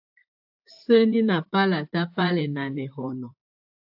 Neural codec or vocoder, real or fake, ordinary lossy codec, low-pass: vocoder, 44.1 kHz, 128 mel bands, Pupu-Vocoder; fake; AAC, 48 kbps; 5.4 kHz